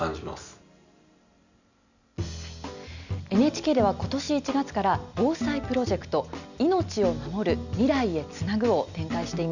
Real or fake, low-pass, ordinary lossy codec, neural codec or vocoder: real; 7.2 kHz; none; none